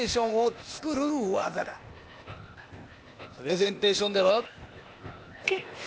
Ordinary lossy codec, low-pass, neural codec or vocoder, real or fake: none; none; codec, 16 kHz, 0.8 kbps, ZipCodec; fake